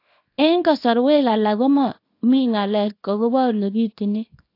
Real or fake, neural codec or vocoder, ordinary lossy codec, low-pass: fake; codec, 24 kHz, 0.9 kbps, WavTokenizer, small release; AAC, 32 kbps; 5.4 kHz